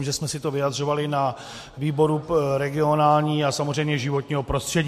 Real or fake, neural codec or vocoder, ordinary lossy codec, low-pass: real; none; MP3, 64 kbps; 14.4 kHz